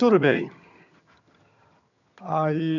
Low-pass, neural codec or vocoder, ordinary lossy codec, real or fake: 7.2 kHz; vocoder, 22.05 kHz, 80 mel bands, HiFi-GAN; none; fake